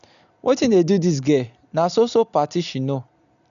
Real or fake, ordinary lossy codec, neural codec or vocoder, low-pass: real; none; none; 7.2 kHz